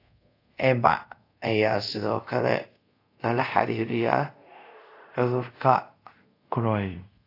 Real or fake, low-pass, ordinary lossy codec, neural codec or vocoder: fake; 5.4 kHz; AAC, 32 kbps; codec, 24 kHz, 0.5 kbps, DualCodec